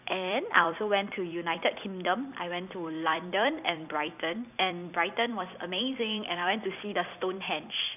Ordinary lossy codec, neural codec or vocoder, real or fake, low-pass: none; vocoder, 44.1 kHz, 128 mel bands every 256 samples, BigVGAN v2; fake; 3.6 kHz